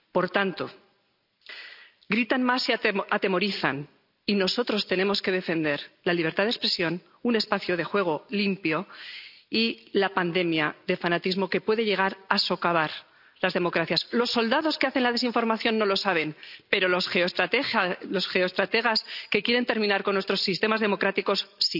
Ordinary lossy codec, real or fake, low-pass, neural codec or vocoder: none; real; 5.4 kHz; none